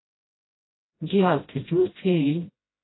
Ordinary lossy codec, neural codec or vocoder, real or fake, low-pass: AAC, 16 kbps; codec, 16 kHz, 0.5 kbps, FreqCodec, smaller model; fake; 7.2 kHz